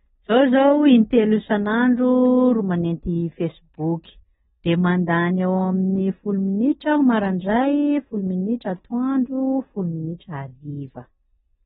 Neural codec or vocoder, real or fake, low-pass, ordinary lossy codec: none; real; 19.8 kHz; AAC, 16 kbps